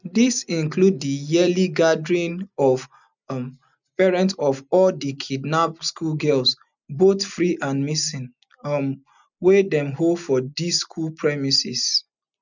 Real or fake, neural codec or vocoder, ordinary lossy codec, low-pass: real; none; none; 7.2 kHz